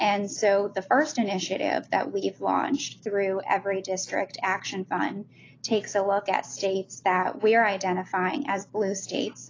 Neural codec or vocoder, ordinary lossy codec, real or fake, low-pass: none; AAC, 32 kbps; real; 7.2 kHz